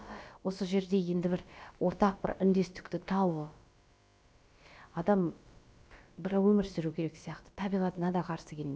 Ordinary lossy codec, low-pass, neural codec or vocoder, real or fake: none; none; codec, 16 kHz, about 1 kbps, DyCAST, with the encoder's durations; fake